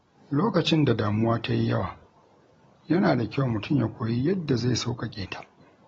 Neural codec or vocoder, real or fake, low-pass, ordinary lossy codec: none; real; 19.8 kHz; AAC, 24 kbps